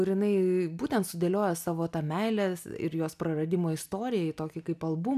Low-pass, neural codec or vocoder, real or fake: 14.4 kHz; none; real